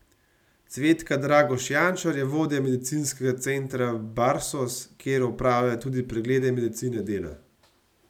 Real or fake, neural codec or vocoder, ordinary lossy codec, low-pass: real; none; none; 19.8 kHz